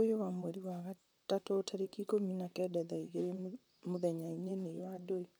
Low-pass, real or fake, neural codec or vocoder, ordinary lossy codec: none; fake; vocoder, 44.1 kHz, 128 mel bands, Pupu-Vocoder; none